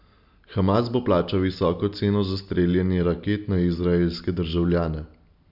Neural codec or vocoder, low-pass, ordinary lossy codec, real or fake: none; 5.4 kHz; none; real